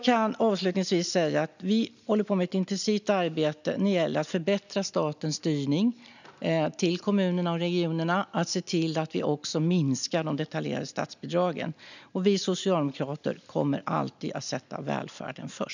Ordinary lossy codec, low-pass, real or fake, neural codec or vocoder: none; 7.2 kHz; real; none